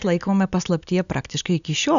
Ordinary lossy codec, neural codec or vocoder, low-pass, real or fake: MP3, 96 kbps; none; 7.2 kHz; real